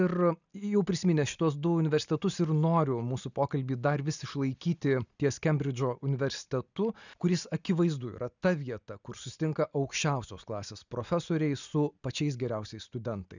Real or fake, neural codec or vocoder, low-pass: real; none; 7.2 kHz